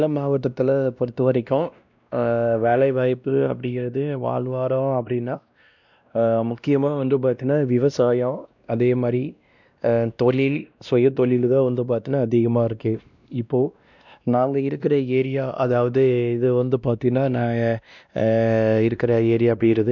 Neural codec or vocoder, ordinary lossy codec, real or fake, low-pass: codec, 16 kHz, 1 kbps, X-Codec, WavLM features, trained on Multilingual LibriSpeech; none; fake; 7.2 kHz